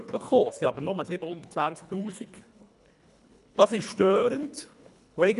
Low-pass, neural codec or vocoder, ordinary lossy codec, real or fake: 10.8 kHz; codec, 24 kHz, 1.5 kbps, HILCodec; none; fake